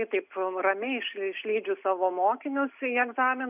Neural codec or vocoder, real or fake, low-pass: none; real; 3.6 kHz